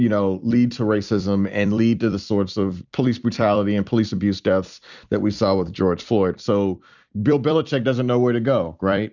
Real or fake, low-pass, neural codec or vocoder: fake; 7.2 kHz; vocoder, 44.1 kHz, 128 mel bands every 256 samples, BigVGAN v2